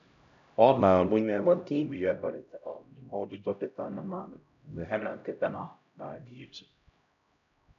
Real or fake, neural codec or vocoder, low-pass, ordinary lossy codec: fake; codec, 16 kHz, 0.5 kbps, X-Codec, HuBERT features, trained on LibriSpeech; 7.2 kHz; MP3, 96 kbps